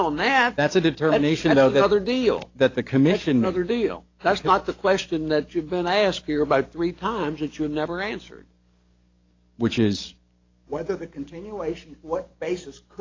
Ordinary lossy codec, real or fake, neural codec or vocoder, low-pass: AAC, 32 kbps; fake; codec, 44.1 kHz, 7.8 kbps, DAC; 7.2 kHz